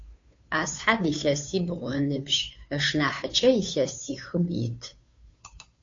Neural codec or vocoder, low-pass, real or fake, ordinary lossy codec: codec, 16 kHz, 2 kbps, FunCodec, trained on Chinese and English, 25 frames a second; 7.2 kHz; fake; AAC, 48 kbps